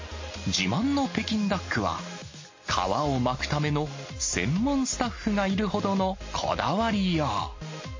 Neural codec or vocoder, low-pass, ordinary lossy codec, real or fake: none; 7.2 kHz; MP3, 32 kbps; real